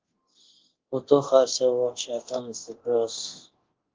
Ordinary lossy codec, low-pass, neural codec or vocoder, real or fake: Opus, 16 kbps; 7.2 kHz; codec, 24 kHz, 0.9 kbps, DualCodec; fake